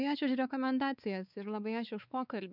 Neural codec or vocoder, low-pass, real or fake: codec, 16 kHz, 4 kbps, X-Codec, WavLM features, trained on Multilingual LibriSpeech; 5.4 kHz; fake